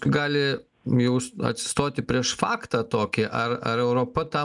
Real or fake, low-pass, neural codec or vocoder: real; 10.8 kHz; none